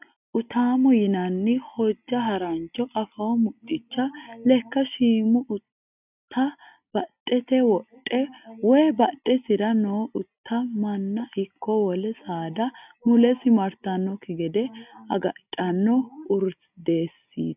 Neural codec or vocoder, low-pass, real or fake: none; 3.6 kHz; real